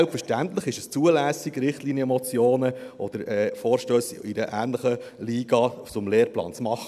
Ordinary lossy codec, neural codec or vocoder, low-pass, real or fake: none; none; 14.4 kHz; real